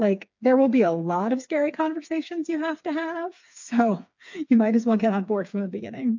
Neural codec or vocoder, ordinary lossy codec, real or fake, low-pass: codec, 16 kHz, 4 kbps, FreqCodec, smaller model; MP3, 48 kbps; fake; 7.2 kHz